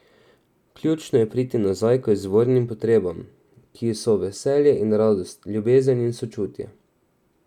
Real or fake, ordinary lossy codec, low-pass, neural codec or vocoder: real; none; 19.8 kHz; none